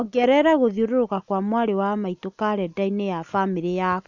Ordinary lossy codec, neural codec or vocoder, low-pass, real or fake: none; none; 7.2 kHz; real